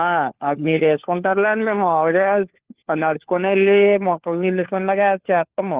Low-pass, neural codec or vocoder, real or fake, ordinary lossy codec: 3.6 kHz; codec, 16 kHz, 4 kbps, FunCodec, trained on LibriTTS, 50 frames a second; fake; Opus, 16 kbps